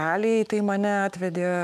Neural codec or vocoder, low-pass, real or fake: autoencoder, 48 kHz, 128 numbers a frame, DAC-VAE, trained on Japanese speech; 14.4 kHz; fake